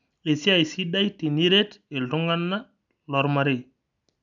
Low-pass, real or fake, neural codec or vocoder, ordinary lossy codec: 7.2 kHz; real; none; none